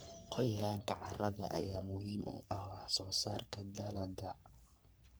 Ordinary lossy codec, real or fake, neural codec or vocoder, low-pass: none; fake; codec, 44.1 kHz, 3.4 kbps, Pupu-Codec; none